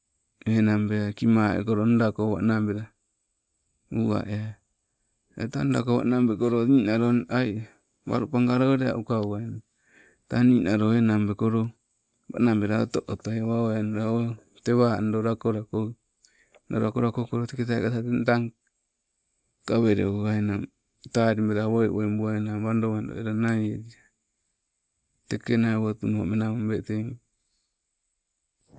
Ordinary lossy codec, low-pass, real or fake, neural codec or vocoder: none; none; real; none